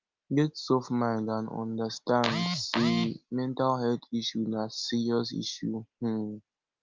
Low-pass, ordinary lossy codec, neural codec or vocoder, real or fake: 7.2 kHz; Opus, 24 kbps; none; real